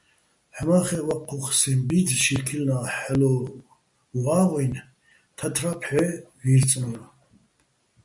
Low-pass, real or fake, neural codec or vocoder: 10.8 kHz; real; none